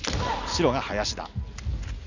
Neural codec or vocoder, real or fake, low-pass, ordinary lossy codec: none; real; 7.2 kHz; none